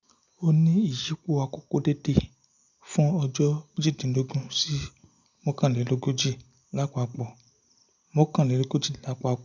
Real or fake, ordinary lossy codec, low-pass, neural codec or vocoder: real; none; 7.2 kHz; none